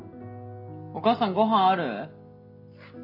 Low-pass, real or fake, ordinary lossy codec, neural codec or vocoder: 5.4 kHz; real; none; none